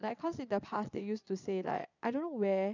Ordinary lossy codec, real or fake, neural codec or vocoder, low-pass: none; fake; vocoder, 22.05 kHz, 80 mel bands, Vocos; 7.2 kHz